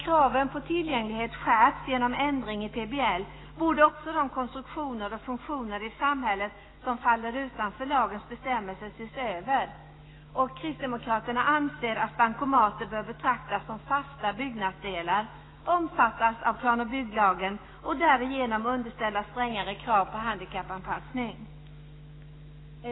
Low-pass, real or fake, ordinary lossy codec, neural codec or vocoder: 7.2 kHz; fake; AAC, 16 kbps; autoencoder, 48 kHz, 128 numbers a frame, DAC-VAE, trained on Japanese speech